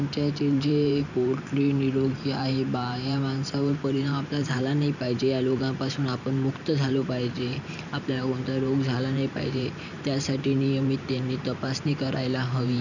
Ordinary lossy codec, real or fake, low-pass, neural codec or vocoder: none; fake; 7.2 kHz; vocoder, 44.1 kHz, 128 mel bands every 512 samples, BigVGAN v2